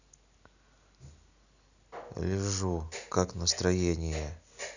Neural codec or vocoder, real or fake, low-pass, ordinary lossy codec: none; real; 7.2 kHz; none